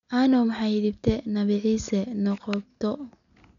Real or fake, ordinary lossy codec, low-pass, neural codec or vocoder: real; none; 7.2 kHz; none